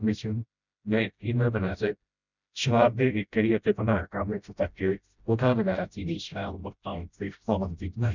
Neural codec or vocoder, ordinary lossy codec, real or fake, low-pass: codec, 16 kHz, 0.5 kbps, FreqCodec, smaller model; none; fake; 7.2 kHz